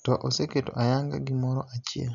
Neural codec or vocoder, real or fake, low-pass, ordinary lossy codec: none; real; 7.2 kHz; none